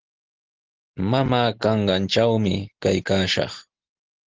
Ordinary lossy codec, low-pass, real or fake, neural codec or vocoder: Opus, 16 kbps; 7.2 kHz; real; none